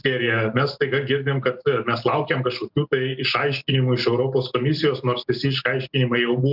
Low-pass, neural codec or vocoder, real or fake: 5.4 kHz; none; real